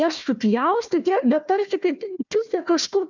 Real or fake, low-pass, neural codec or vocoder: fake; 7.2 kHz; codec, 16 kHz, 1 kbps, FunCodec, trained on Chinese and English, 50 frames a second